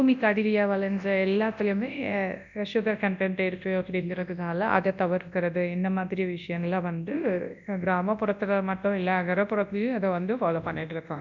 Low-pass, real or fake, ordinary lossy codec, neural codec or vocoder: 7.2 kHz; fake; none; codec, 24 kHz, 0.9 kbps, WavTokenizer, large speech release